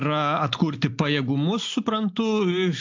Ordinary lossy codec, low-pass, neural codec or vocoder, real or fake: AAC, 48 kbps; 7.2 kHz; none; real